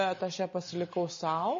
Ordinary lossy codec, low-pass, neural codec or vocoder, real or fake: MP3, 32 kbps; 7.2 kHz; none; real